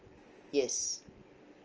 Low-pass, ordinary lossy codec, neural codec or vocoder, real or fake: 7.2 kHz; Opus, 24 kbps; none; real